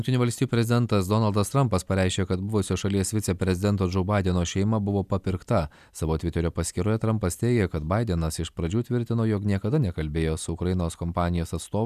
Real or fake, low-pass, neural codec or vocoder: real; 14.4 kHz; none